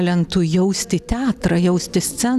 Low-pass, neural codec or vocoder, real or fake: 14.4 kHz; none; real